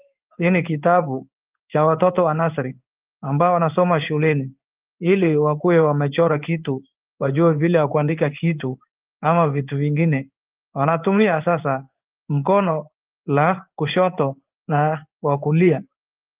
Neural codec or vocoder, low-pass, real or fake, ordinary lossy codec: codec, 16 kHz in and 24 kHz out, 1 kbps, XY-Tokenizer; 3.6 kHz; fake; Opus, 32 kbps